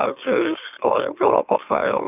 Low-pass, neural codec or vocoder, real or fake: 3.6 kHz; autoencoder, 44.1 kHz, a latent of 192 numbers a frame, MeloTTS; fake